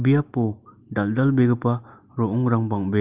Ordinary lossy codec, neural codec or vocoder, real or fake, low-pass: Opus, 32 kbps; none; real; 3.6 kHz